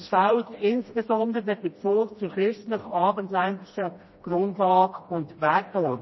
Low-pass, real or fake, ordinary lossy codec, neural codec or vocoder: 7.2 kHz; fake; MP3, 24 kbps; codec, 16 kHz, 1 kbps, FreqCodec, smaller model